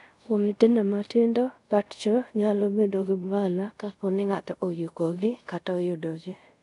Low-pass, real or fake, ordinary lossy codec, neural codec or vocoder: 10.8 kHz; fake; none; codec, 24 kHz, 0.5 kbps, DualCodec